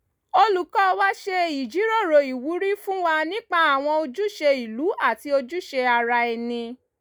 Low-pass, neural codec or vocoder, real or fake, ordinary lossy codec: none; none; real; none